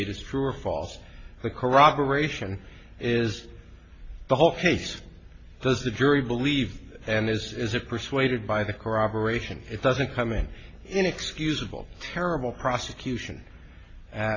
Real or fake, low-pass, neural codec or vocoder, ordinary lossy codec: real; 7.2 kHz; none; AAC, 32 kbps